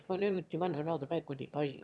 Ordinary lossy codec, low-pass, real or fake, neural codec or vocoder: none; none; fake; autoencoder, 22.05 kHz, a latent of 192 numbers a frame, VITS, trained on one speaker